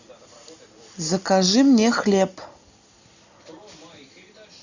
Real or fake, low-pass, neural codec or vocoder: real; 7.2 kHz; none